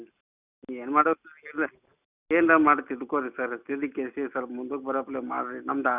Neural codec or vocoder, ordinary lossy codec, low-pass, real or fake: none; none; 3.6 kHz; real